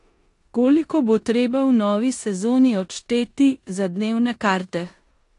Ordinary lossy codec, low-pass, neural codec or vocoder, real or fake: AAC, 48 kbps; 10.8 kHz; codec, 16 kHz in and 24 kHz out, 0.9 kbps, LongCat-Audio-Codec, four codebook decoder; fake